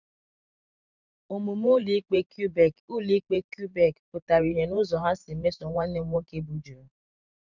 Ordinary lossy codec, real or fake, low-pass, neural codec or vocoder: none; fake; 7.2 kHz; vocoder, 44.1 kHz, 128 mel bands every 512 samples, BigVGAN v2